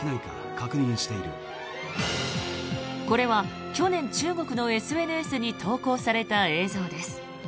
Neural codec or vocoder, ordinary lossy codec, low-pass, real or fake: none; none; none; real